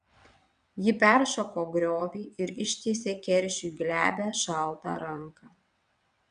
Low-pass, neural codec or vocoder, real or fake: 9.9 kHz; vocoder, 22.05 kHz, 80 mel bands, WaveNeXt; fake